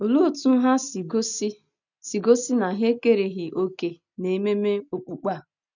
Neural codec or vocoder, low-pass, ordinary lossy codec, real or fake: none; 7.2 kHz; none; real